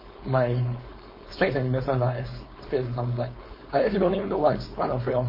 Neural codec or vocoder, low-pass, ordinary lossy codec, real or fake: codec, 16 kHz, 4.8 kbps, FACodec; 5.4 kHz; MP3, 24 kbps; fake